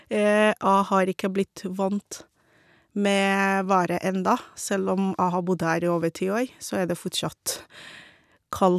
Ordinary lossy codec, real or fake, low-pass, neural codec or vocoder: none; real; 14.4 kHz; none